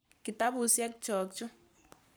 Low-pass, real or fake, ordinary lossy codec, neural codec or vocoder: none; fake; none; codec, 44.1 kHz, 7.8 kbps, Pupu-Codec